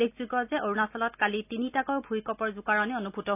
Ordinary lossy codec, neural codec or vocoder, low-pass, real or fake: none; none; 3.6 kHz; real